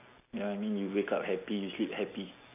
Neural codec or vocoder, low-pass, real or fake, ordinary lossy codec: none; 3.6 kHz; real; none